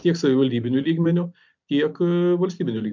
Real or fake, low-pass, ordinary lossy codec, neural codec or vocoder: real; 7.2 kHz; MP3, 64 kbps; none